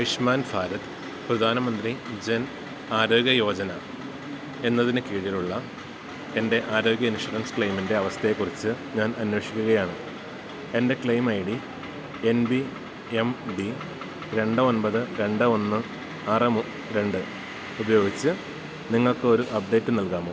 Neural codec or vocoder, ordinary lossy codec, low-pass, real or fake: none; none; none; real